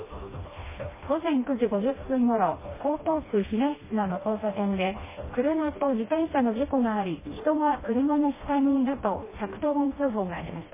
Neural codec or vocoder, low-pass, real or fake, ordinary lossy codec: codec, 16 kHz, 1 kbps, FreqCodec, smaller model; 3.6 kHz; fake; MP3, 16 kbps